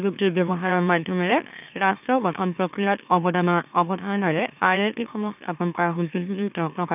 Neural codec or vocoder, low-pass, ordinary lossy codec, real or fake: autoencoder, 44.1 kHz, a latent of 192 numbers a frame, MeloTTS; 3.6 kHz; none; fake